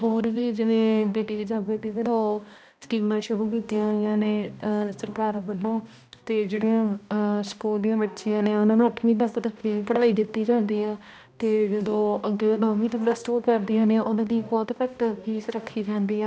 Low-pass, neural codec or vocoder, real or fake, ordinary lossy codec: none; codec, 16 kHz, 1 kbps, X-Codec, HuBERT features, trained on balanced general audio; fake; none